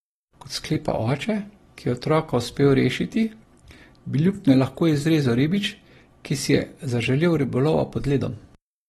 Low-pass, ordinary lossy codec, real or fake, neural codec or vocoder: 19.8 kHz; AAC, 32 kbps; real; none